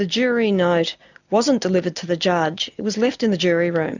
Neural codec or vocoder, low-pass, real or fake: none; 7.2 kHz; real